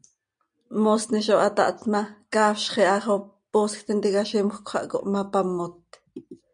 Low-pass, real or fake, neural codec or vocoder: 9.9 kHz; real; none